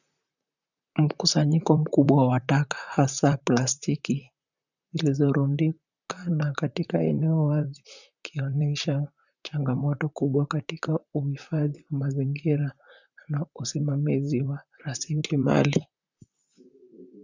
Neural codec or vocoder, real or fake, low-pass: vocoder, 44.1 kHz, 128 mel bands, Pupu-Vocoder; fake; 7.2 kHz